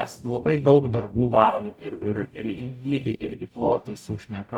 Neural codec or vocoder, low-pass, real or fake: codec, 44.1 kHz, 0.9 kbps, DAC; 19.8 kHz; fake